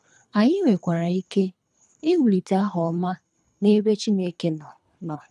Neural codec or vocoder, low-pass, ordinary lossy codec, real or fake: codec, 24 kHz, 3 kbps, HILCodec; none; none; fake